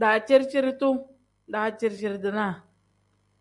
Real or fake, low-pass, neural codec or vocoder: real; 10.8 kHz; none